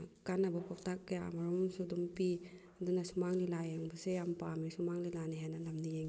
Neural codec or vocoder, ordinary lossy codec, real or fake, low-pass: none; none; real; none